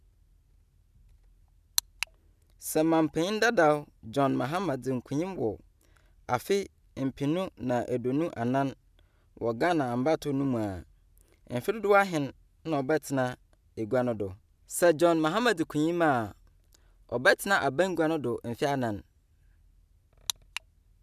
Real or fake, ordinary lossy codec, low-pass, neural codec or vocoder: real; none; 14.4 kHz; none